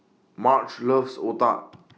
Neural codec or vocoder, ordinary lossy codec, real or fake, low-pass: none; none; real; none